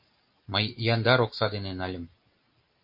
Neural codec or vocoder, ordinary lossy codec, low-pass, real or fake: none; MP3, 32 kbps; 5.4 kHz; real